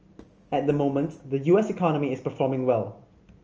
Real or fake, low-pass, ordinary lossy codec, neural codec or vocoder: real; 7.2 kHz; Opus, 24 kbps; none